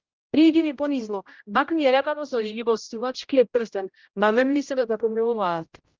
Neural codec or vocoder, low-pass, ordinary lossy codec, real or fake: codec, 16 kHz, 0.5 kbps, X-Codec, HuBERT features, trained on general audio; 7.2 kHz; Opus, 24 kbps; fake